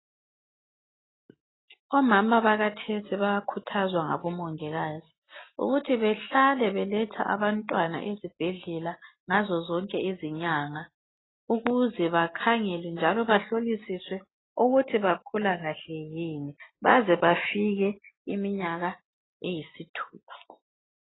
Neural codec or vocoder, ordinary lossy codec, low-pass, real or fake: none; AAC, 16 kbps; 7.2 kHz; real